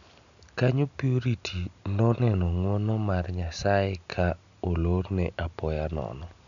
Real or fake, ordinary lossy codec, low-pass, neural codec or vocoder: real; none; 7.2 kHz; none